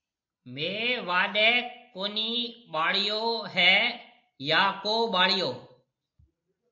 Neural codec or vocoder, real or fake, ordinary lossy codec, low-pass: none; real; MP3, 48 kbps; 7.2 kHz